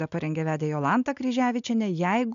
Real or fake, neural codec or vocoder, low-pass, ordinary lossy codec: real; none; 7.2 kHz; MP3, 96 kbps